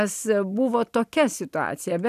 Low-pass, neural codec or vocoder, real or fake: 14.4 kHz; none; real